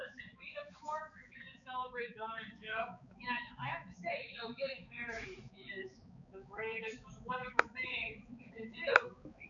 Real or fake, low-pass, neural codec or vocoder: fake; 7.2 kHz; codec, 16 kHz, 4 kbps, X-Codec, HuBERT features, trained on balanced general audio